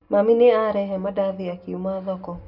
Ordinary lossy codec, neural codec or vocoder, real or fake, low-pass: none; none; real; 5.4 kHz